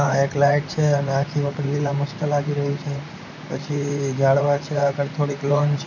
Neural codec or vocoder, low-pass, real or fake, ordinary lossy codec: vocoder, 44.1 kHz, 128 mel bands, Pupu-Vocoder; 7.2 kHz; fake; none